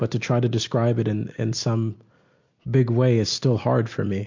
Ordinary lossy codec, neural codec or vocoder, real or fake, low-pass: MP3, 48 kbps; none; real; 7.2 kHz